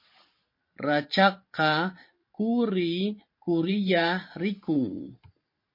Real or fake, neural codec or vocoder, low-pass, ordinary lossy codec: fake; vocoder, 44.1 kHz, 128 mel bands every 512 samples, BigVGAN v2; 5.4 kHz; MP3, 32 kbps